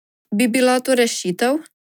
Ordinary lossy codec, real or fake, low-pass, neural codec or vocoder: none; real; 19.8 kHz; none